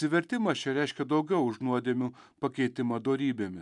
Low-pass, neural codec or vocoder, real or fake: 10.8 kHz; none; real